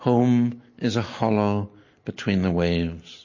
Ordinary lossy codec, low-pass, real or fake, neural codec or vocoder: MP3, 32 kbps; 7.2 kHz; real; none